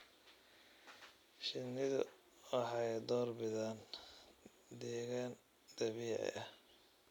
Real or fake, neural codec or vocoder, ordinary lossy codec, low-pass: real; none; none; 19.8 kHz